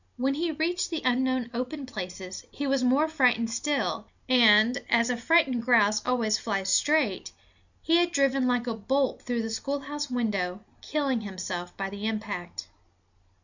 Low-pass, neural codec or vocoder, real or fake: 7.2 kHz; none; real